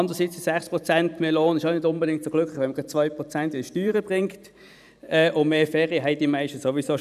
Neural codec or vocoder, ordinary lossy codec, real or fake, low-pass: vocoder, 44.1 kHz, 128 mel bands every 512 samples, BigVGAN v2; none; fake; 14.4 kHz